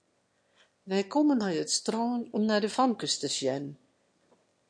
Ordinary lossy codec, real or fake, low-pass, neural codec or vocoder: MP3, 48 kbps; fake; 9.9 kHz; autoencoder, 22.05 kHz, a latent of 192 numbers a frame, VITS, trained on one speaker